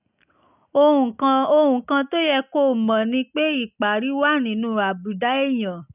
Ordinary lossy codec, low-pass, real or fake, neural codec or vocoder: none; 3.6 kHz; real; none